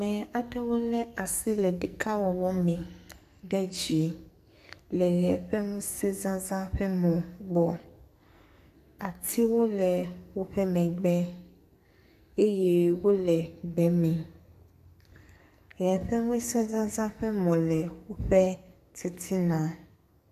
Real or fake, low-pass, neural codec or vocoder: fake; 14.4 kHz; codec, 44.1 kHz, 2.6 kbps, SNAC